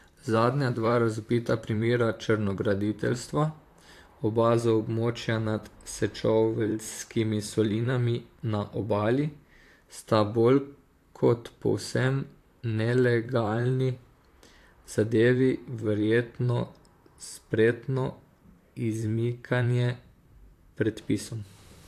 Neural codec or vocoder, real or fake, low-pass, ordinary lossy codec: vocoder, 44.1 kHz, 128 mel bands, Pupu-Vocoder; fake; 14.4 kHz; AAC, 64 kbps